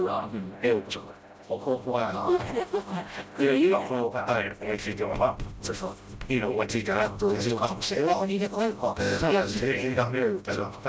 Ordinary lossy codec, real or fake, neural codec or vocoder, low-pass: none; fake; codec, 16 kHz, 0.5 kbps, FreqCodec, smaller model; none